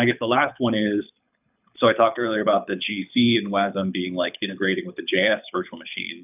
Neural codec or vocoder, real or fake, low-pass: codec, 24 kHz, 6 kbps, HILCodec; fake; 3.6 kHz